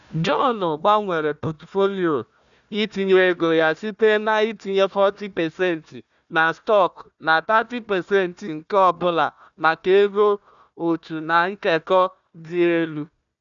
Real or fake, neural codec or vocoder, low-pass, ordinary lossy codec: fake; codec, 16 kHz, 1 kbps, FunCodec, trained on Chinese and English, 50 frames a second; 7.2 kHz; none